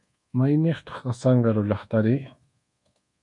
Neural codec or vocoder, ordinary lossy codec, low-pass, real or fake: codec, 24 kHz, 1.2 kbps, DualCodec; MP3, 64 kbps; 10.8 kHz; fake